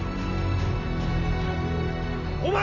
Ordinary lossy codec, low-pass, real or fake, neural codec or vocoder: none; 7.2 kHz; real; none